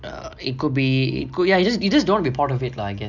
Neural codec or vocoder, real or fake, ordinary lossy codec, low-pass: none; real; none; 7.2 kHz